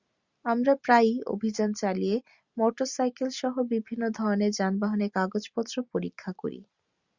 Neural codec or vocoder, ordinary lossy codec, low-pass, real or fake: none; Opus, 64 kbps; 7.2 kHz; real